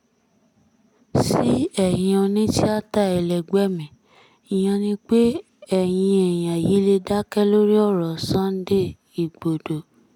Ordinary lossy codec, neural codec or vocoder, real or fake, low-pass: none; none; real; 19.8 kHz